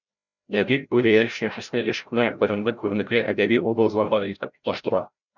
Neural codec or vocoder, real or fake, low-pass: codec, 16 kHz, 0.5 kbps, FreqCodec, larger model; fake; 7.2 kHz